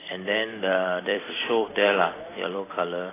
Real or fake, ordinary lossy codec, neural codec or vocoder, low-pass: fake; AAC, 16 kbps; vocoder, 44.1 kHz, 128 mel bands every 256 samples, BigVGAN v2; 3.6 kHz